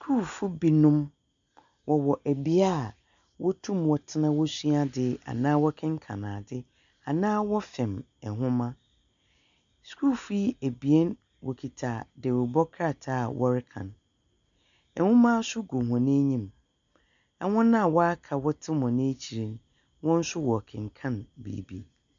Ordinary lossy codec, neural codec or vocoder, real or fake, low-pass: MP3, 96 kbps; none; real; 7.2 kHz